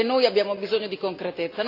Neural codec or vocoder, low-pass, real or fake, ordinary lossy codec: none; 5.4 kHz; real; AAC, 32 kbps